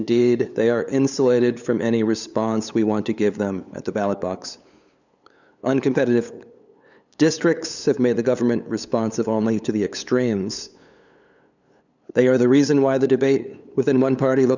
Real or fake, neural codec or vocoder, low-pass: fake; codec, 16 kHz, 8 kbps, FunCodec, trained on LibriTTS, 25 frames a second; 7.2 kHz